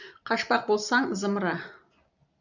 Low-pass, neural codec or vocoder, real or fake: 7.2 kHz; none; real